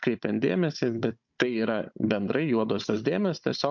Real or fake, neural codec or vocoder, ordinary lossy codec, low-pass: fake; codec, 16 kHz, 16 kbps, FunCodec, trained on Chinese and English, 50 frames a second; MP3, 64 kbps; 7.2 kHz